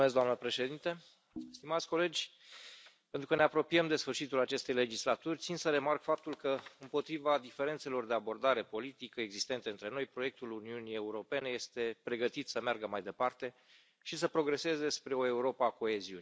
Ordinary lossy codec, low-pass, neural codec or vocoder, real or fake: none; none; none; real